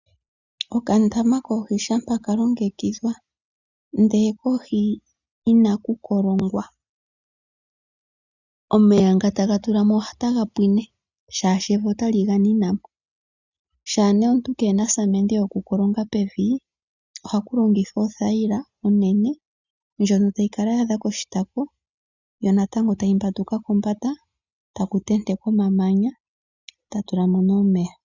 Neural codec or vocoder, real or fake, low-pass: none; real; 7.2 kHz